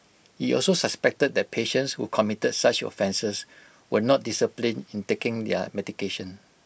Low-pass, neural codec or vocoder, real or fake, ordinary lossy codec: none; none; real; none